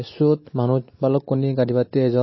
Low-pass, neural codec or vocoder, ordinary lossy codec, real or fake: 7.2 kHz; none; MP3, 24 kbps; real